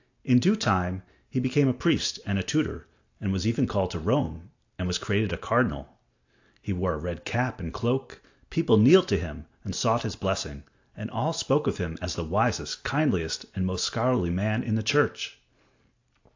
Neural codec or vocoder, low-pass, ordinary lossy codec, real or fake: none; 7.2 kHz; AAC, 48 kbps; real